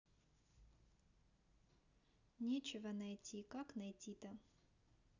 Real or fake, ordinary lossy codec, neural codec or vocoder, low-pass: real; none; none; 7.2 kHz